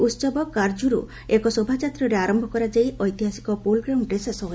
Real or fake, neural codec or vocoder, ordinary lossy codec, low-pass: real; none; none; none